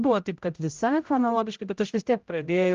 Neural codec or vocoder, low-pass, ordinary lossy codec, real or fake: codec, 16 kHz, 0.5 kbps, X-Codec, HuBERT features, trained on general audio; 7.2 kHz; Opus, 32 kbps; fake